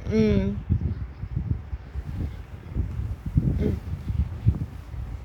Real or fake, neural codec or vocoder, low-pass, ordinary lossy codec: fake; codec, 44.1 kHz, 7.8 kbps, Pupu-Codec; 19.8 kHz; none